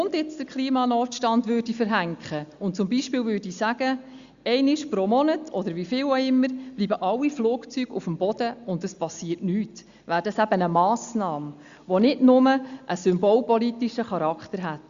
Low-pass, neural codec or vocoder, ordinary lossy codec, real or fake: 7.2 kHz; none; Opus, 64 kbps; real